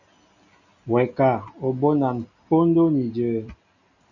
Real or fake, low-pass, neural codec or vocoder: real; 7.2 kHz; none